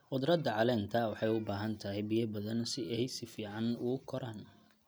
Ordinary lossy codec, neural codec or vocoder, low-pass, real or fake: none; none; none; real